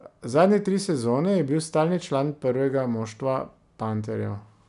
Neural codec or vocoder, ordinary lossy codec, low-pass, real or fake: none; none; 10.8 kHz; real